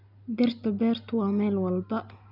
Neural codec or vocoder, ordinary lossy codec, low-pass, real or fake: none; none; 5.4 kHz; real